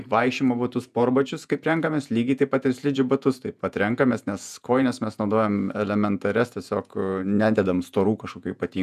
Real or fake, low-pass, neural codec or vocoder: fake; 14.4 kHz; vocoder, 48 kHz, 128 mel bands, Vocos